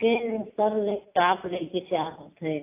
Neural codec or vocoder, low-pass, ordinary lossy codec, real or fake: none; 3.6 kHz; AAC, 24 kbps; real